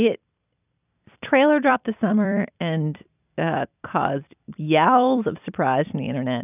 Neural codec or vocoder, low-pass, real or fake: vocoder, 44.1 kHz, 128 mel bands every 256 samples, BigVGAN v2; 3.6 kHz; fake